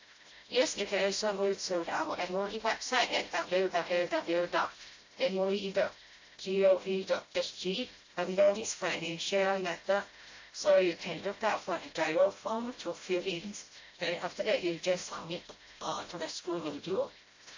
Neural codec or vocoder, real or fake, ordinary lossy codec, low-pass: codec, 16 kHz, 0.5 kbps, FreqCodec, smaller model; fake; AAC, 48 kbps; 7.2 kHz